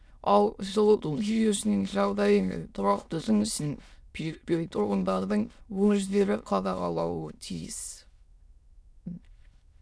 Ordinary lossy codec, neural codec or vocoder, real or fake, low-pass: none; autoencoder, 22.05 kHz, a latent of 192 numbers a frame, VITS, trained on many speakers; fake; none